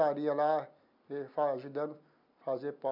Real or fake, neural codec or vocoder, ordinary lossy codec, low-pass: real; none; MP3, 48 kbps; 5.4 kHz